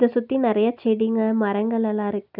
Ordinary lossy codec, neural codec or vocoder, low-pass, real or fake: none; none; 5.4 kHz; real